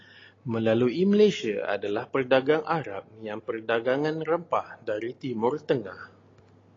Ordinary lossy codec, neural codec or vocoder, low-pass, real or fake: AAC, 32 kbps; none; 7.2 kHz; real